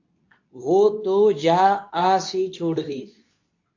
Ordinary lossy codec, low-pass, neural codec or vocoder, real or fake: AAC, 48 kbps; 7.2 kHz; codec, 24 kHz, 0.9 kbps, WavTokenizer, medium speech release version 2; fake